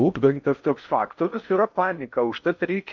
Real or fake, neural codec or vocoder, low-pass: fake; codec, 16 kHz in and 24 kHz out, 0.6 kbps, FocalCodec, streaming, 4096 codes; 7.2 kHz